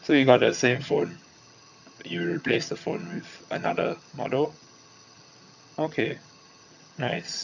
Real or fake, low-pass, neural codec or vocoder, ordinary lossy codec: fake; 7.2 kHz; vocoder, 22.05 kHz, 80 mel bands, HiFi-GAN; none